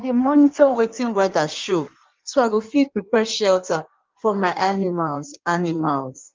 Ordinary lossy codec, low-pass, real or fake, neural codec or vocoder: Opus, 32 kbps; 7.2 kHz; fake; codec, 16 kHz in and 24 kHz out, 1.1 kbps, FireRedTTS-2 codec